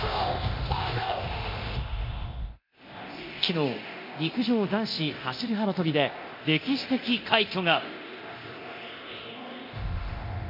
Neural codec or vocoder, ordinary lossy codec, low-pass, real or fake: codec, 24 kHz, 0.9 kbps, DualCodec; MP3, 32 kbps; 5.4 kHz; fake